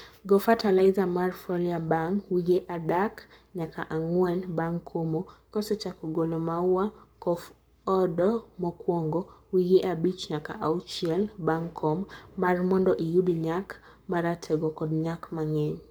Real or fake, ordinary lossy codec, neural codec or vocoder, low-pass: fake; none; codec, 44.1 kHz, 7.8 kbps, Pupu-Codec; none